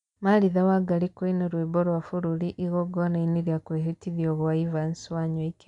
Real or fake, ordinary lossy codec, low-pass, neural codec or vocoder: real; none; 10.8 kHz; none